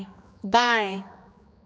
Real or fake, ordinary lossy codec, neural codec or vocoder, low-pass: fake; none; codec, 16 kHz, 2 kbps, X-Codec, HuBERT features, trained on general audio; none